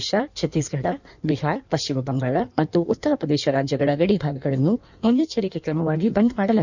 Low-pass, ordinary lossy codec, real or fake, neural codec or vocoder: 7.2 kHz; none; fake; codec, 16 kHz in and 24 kHz out, 1.1 kbps, FireRedTTS-2 codec